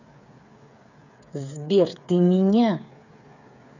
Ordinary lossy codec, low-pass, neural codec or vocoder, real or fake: none; 7.2 kHz; codec, 16 kHz, 8 kbps, FreqCodec, smaller model; fake